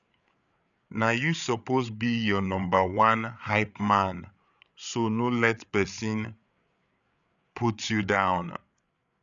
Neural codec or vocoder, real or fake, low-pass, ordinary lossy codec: codec, 16 kHz, 8 kbps, FreqCodec, larger model; fake; 7.2 kHz; none